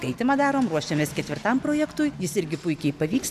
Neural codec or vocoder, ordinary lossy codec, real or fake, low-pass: none; MP3, 96 kbps; real; 14.4 kHz